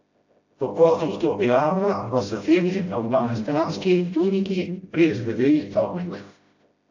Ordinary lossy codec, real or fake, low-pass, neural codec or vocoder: AAC, 48 kbps; fake; 7.2 kHz; codec, 16 kHz, 0.5 kbps, FreqCodec, smaller model